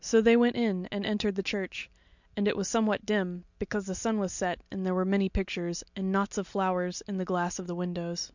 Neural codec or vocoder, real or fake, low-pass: none; real; 7.2 kHz